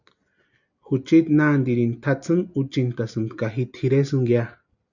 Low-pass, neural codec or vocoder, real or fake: 7.2 kHz; none; real